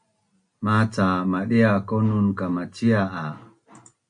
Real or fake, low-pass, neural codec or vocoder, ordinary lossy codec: real; 9.9 kHz; none; MP3, 64 kbps